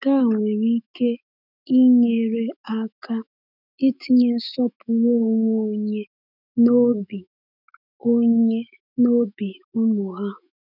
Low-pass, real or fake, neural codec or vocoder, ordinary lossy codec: 5.4 kHz; fake; autoencoder, 48 kHz, 128 numbers a frame, DAC-VAE, trained on Japanese speech; none